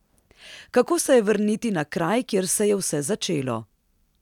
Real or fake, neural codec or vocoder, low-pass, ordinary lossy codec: real; none; 19.8 kHz; none